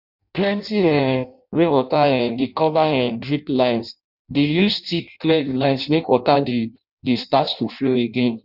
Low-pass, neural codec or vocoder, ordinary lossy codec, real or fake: 5.4 kHz; codec, 16 kHz in and 24 kHz out, 0.6 kbps, FireRedTTS-2 codec; none; fake